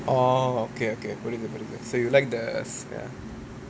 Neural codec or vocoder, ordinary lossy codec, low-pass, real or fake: none; none; none; real